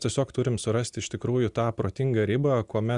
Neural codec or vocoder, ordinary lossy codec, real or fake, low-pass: none; Opus, 64 kbps; real; 10.8 kHz